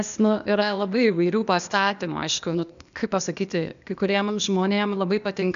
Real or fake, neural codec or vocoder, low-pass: fake; codec, 16 kHz, 0.8 kbps, ZipCodec; 7.2 kHz